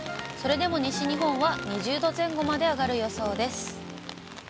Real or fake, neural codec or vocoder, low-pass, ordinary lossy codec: real; none; none; none